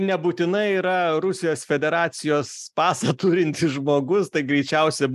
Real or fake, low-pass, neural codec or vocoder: real; 14.4 kHz; none